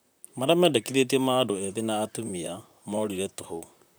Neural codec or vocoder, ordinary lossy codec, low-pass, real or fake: vocoder, 44.1 kHz, 128 mel bands, Pupu-Vocoder; none; none; fake